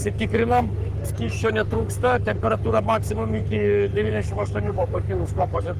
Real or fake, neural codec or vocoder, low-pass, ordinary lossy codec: fake; codec, 44.1 kHz, 3.4 kbps, Pupu-Codec; 14.4 kHz; Opus, 24 kbps